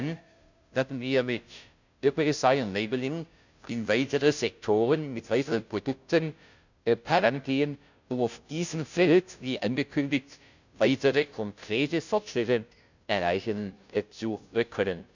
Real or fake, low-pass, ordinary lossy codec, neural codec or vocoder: fake; 7.2 kHz; none; codec, 16 kHz, 0.5 kbps, FunCodec, trained on Chinese and English, 25 frames a second